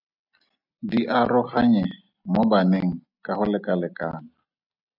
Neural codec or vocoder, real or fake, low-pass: none; real; 5.4 kHz